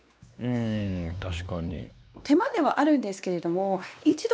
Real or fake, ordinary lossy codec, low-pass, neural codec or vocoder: fake; none; none; codec, 16 kHz, 2 kbps, X-Codec, WavLM features, trained on Multilingual LibriSpeech